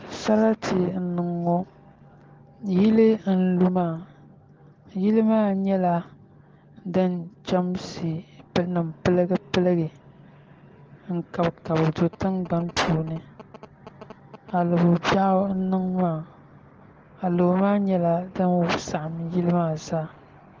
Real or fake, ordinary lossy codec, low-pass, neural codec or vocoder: real; Opus, 16 kbps; 7.2 kHz; none